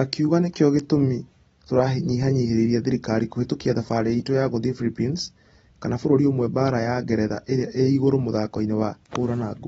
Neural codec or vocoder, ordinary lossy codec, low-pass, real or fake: none; AAC, 24 kbps; 10.8 kHz; real